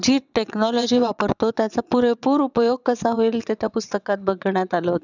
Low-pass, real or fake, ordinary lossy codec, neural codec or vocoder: 7.2 kHz; fake; none; vocoder, 22.05 kHz, 80 mel bands, WaveNeXt